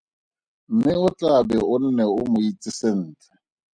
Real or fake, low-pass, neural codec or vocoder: real; 9.9 kHz; none